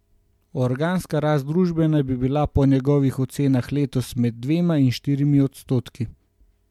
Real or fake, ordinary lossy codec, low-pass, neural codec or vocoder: real; MP3, 96 kbps; 19.8 kHz; none